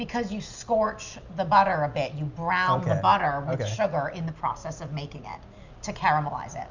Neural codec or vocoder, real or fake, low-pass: none; real; 7.2 kHz